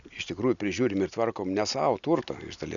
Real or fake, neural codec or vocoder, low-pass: real; none; 7.2 kHz